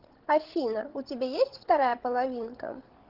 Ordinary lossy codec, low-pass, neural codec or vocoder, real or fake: Opus, 16 kbps; 5.4 kHz; codec, 16 kHz, 16 kbps, FreqCodec, larger model; fake